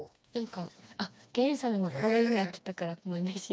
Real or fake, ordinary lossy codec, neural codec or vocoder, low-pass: fake; none; codec, 16 kHz, 2 kbps, FreqCodec, smaller model; none